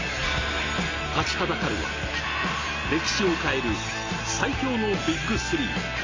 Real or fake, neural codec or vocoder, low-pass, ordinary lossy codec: real; none; 7.2 kHz; AAC, 32 kbps